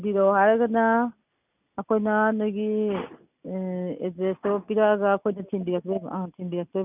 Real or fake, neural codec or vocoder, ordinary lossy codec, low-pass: real; none; none; 3.6 kHz